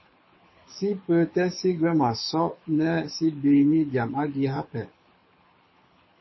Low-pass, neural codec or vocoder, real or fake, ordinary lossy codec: 7.2 kHz; codec, 24 kHz, 6 kbps, HILCodec; fake; MP3, 24 kbps